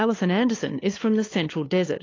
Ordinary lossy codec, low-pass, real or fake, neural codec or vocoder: AAC, 32 kbps; 7.2 kHz; fake; codec, 16 kHz, 8 kbps, FunCodec, trained on LibriTTS, 25 frames a second